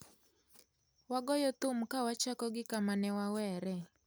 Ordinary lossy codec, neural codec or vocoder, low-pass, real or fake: none; none; none; real